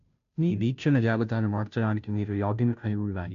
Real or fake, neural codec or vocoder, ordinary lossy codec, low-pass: fake; codec, 16 kHz, 0.5 kbps, FunCodec, trained on Chinese and English, 25 frames a second; none; 7.2 kHz